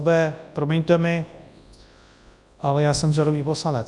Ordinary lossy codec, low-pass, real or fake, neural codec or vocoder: MP3, 96 kbps; 10.8 kHz; fake; codec, 24 kHz, 0.9 kbps, WavTokenizer, large speech release